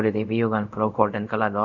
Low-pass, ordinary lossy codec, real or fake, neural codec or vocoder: 7.2 kHz; none; fake; codec, 24 kHz, 0.5 kbps, DualCodec